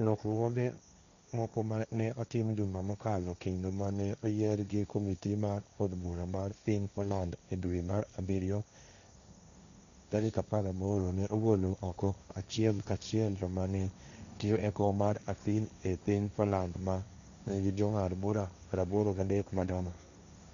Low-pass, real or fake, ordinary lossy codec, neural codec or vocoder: 7.2 kHz; fake; none; codec, 16 kHz, 1.1 kbps, Voila-Tokenizer